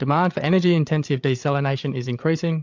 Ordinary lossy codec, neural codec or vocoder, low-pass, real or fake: MP3, 64 kbps; codec, 16 kHz, 8 kbps, FreqCodec, larger model; 7.2 kHz; fake